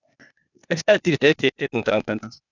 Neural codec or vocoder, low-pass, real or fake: codec, 16 kHz, 0.8 kbps, ZipCodec; 7.2 kHz; fake